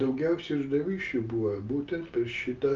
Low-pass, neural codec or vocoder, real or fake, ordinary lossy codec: 7.2 kHz; none; real; Opus, 32 kbps